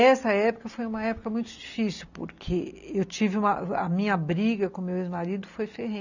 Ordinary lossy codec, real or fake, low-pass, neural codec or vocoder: none; real; 7.2 kHz; none